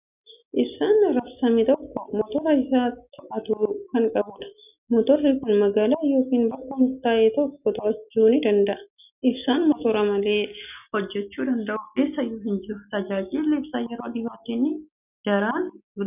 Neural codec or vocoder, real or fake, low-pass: none; real; 3.6 kHz